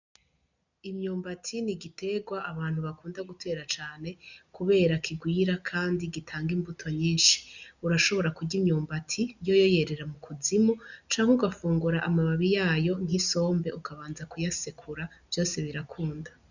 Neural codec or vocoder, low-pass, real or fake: none; 7.2 kHz; real